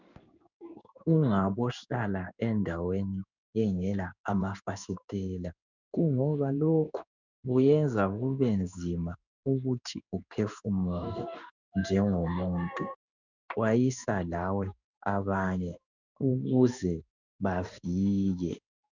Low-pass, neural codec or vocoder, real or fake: 7.2 kHz; codec, 16 kHz in and 24 kHz out, 1 kbps, XY-Tokenizer; fake